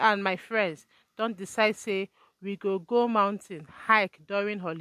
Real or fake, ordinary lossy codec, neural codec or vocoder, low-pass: real; MP3, 64 kbps; none; 14.4 kHz